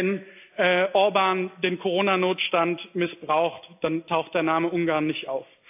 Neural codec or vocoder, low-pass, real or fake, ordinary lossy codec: none; 3.6 kHz; real; AAC, 32 kbps